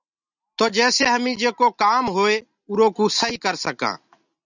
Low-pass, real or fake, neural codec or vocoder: 7.2 kHz; real; none